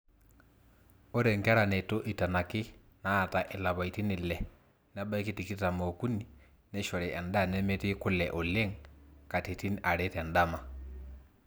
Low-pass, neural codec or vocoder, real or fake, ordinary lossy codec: none; none; real; none